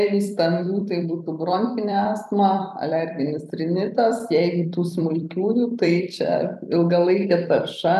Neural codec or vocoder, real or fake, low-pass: vocoder, 44.1 kHz, 128 mel bands every 256 samples, BigVGAN v2; fake; 14.4 kHz